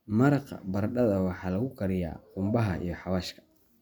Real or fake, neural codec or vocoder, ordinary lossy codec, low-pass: real; none; none; 19.8 kHz